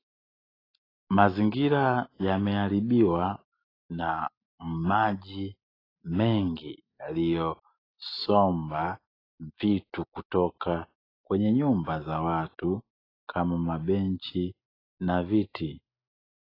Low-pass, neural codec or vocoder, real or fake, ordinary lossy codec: 5.4 kHz; none; real; AAC, 24 kbps